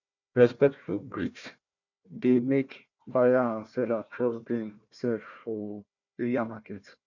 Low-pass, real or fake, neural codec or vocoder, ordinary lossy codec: 7.2 kHz; fake; codec, 16 kHz, 1 kbps, FunCodec, trained on Chinese and English, 50 frames a second; none